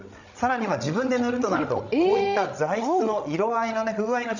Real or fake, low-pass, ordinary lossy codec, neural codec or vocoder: fake; 7.2 kHz; none; codec, 16 kHz, 16 kbps, FreqCodec, larger model